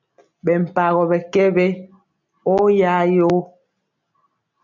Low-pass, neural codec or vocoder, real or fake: 7.2 kHz; none; real